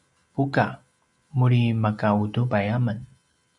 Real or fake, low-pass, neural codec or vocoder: real; 10.8 kHz; none